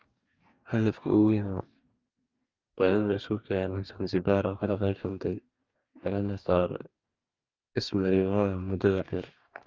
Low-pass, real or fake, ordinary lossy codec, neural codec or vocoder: 7.2 kHz; fake; Opus, 32 kbps; codec, 44.1 kHz, 2.6 kbps, DAC